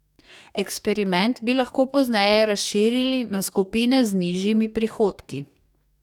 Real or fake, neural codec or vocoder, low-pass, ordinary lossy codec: fake; codec, 44.1 kHz, 2.6 kbps, DAC; 19.8 kHz; none